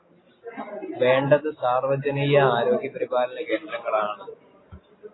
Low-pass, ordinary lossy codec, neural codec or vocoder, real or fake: 7.2 kHz; AAC, 16 kbps; none; real